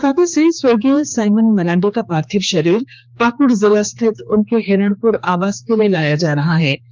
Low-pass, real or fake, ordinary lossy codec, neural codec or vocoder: none; fake; none; codec, 16 kHz, 2 kbps, X-Codec, HuBERT features, trained on general audio